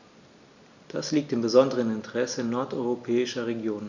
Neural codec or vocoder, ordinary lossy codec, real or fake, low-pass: none; Opus, 64 kbps; real; 7.2 kHz